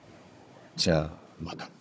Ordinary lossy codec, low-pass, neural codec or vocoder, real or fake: none; none; codec, 16 kHz, 16 kbps, FunCodec, trained on Chinese and English, 50 frames a second; fake